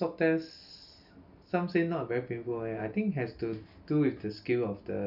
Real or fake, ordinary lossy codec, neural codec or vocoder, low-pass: real; none; none; 5.4 kHz